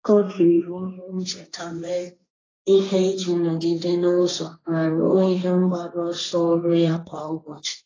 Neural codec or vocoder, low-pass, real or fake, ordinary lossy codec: codec, 16 kHz, 1.1 kbps, Voila-Tokenizer; 7.2 kHz; fake; AAC, 32 kbps